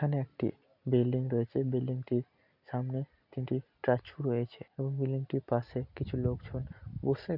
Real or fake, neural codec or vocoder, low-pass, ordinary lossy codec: real; none; 5.4 kHz; none